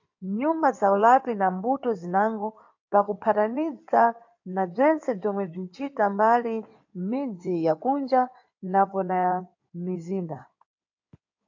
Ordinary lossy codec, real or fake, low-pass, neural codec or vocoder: AAC, 48 kbps; fake; 7.2 kHz; codec, 16 kHz in and 24 kHz out, 2.2 kbps, FireRedTTS-2 codec